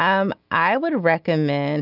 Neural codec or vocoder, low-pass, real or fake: none; 5.4 kHz; real